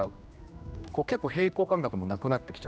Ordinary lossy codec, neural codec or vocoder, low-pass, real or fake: none; codec, 16 kHz, 1 kbps, X-Codec, HuBERT features, trained on general audio; none; fake